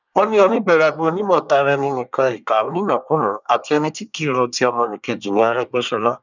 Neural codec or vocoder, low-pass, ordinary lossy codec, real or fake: codec, 24 kHz, 1 kbps, SNAC; 7.2 kHz; none; fake